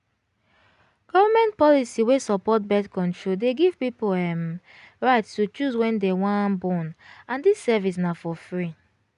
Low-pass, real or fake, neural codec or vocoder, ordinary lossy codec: 10.8 kHz; real; none; none